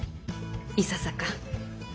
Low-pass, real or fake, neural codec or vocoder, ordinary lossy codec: none; real; none; none